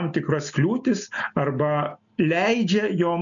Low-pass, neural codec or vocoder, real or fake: 7.2 kHz; none; real